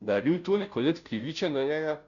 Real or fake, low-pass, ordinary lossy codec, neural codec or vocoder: fake; 7.2 kHz; none; codec, 16 kHz, 0.5 kbps, FunCodec, trained on Chinese and English, 25 frames a second